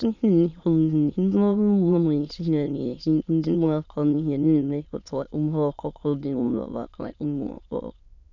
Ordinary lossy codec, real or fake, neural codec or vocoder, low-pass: none; fake; autoencoder, 22.05 kHz, a latent of 192 numbers a frame, VITS, trained on many speakers; 7.2 kHz